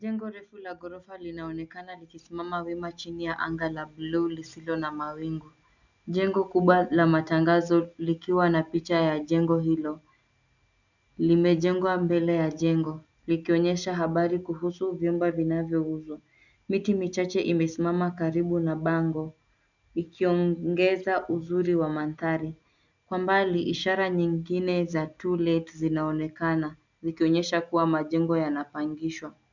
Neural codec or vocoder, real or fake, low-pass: none; real; 7.2 kHz